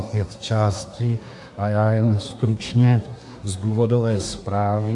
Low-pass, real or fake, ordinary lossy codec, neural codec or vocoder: 10.8 kHz; fake; AAC, 64 kbps; codec, 24 kHz, 1 kbps, SNAC